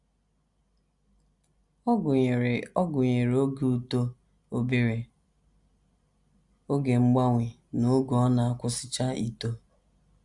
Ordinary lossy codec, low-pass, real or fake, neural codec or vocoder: Opus, 64 kbps; 10.8 kHz; real; none